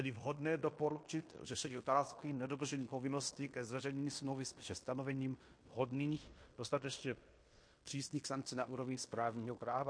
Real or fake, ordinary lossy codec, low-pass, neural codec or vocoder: fake; MP3, 48 kbps; 9.9 kHz; codec, 16 kHz in and 24 kHz out, 0.9 kbps, LongCat-Audio-Codec, fine tuned four codebook decoder